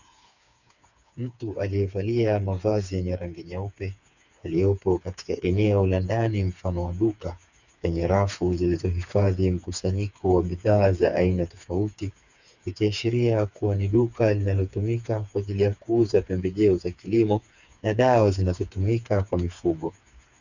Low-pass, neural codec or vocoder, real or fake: 7.2 kHz; codec, 16 kHz, 4 kbps, FreqCodec, smaller model; fake